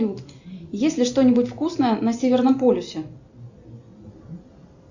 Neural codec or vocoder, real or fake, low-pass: none; real; 7.2 kHz